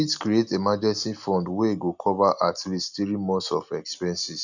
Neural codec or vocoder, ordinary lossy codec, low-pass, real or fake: none; none; 7.2 kHz; real